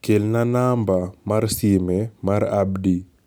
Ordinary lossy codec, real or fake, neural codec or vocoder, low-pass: none; real; none; none